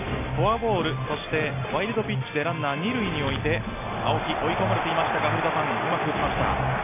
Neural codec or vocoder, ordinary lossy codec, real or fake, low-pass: none; AAC, 32 kbps; real; 3.6 kHz